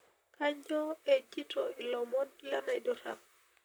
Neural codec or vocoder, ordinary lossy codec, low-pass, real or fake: vocoder, 44.1 kHz, 128 mel bands, Pupu-Vocoder; none; none; fake